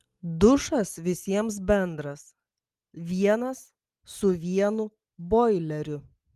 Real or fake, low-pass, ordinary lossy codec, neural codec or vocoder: real; 14.4 kHz; Opus, 32 kbps; none